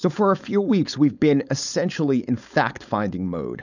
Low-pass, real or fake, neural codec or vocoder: 7.2 kHz; fake; codec, 16 kHz, 4.8 kbps, FACodec